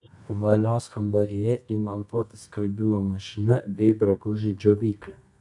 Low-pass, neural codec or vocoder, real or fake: 10.8 kHz; codec, 24 kHz, 0.9 kbps, WavTokenizer, medium music audio release; fake